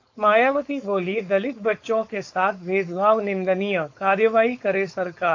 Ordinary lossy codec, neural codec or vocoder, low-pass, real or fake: AAC, 48 kbps; codec, 16 kHz, 4.8 kbps, FACodec; 7.2 kHz; fake